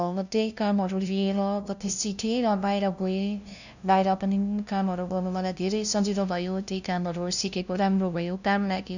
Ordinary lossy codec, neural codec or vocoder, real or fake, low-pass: none; codec, 16 kHz, 0.5 kbps, FunCodec, trained on LibriTTS, 25 frames a second; fake; 7.2 kHz